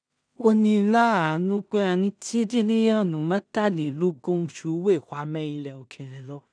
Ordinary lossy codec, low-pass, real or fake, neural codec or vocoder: none; 9.9 kHz; fake; codec, 16 kHz in and 24 kHz out, 0.4 kbps, LongCat-Audio-Codec, two codebook decoder